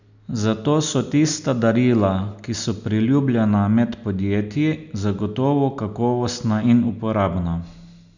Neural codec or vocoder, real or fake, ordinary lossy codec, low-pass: none; real; none; 7.2 kHz